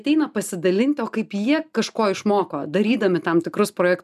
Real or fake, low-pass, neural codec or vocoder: real; 14.4 kHz; none